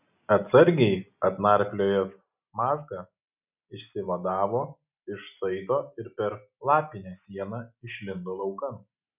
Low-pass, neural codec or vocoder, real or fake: 3.6 kHz; none; real